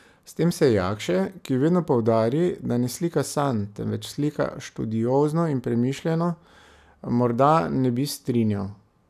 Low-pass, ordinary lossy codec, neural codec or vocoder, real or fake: 14.4 kHz; none; none; real